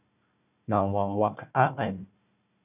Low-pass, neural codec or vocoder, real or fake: 3.6 kHz; codec, 16 kHz, 1 kbps, FunCodec, trained on Chinese and English, 50 frames a second; fake